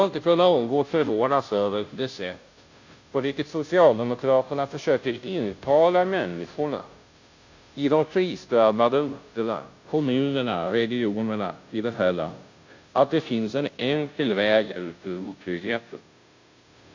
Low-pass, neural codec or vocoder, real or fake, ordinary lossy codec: 7.2 kHz; codec, 16 kHz, 0.5 kbps, FunCodec, trained on Chinese and English, 25 frames a second; fake; AAC, 48 kbps